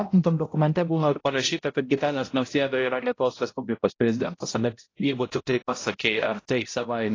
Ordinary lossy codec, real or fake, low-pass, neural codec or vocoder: AAC, 32 kbps; fake; 7.2 kHz; codec, 16 kHz, 0.5 kbps, X-Codec, HuBERT features, trained on balanced general audio